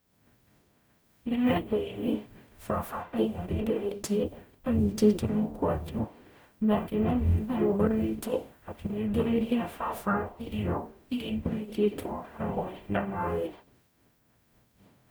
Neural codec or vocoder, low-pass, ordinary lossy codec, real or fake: codec, 44.1 kHz, 0.9 kbps, DAC; none; none; fake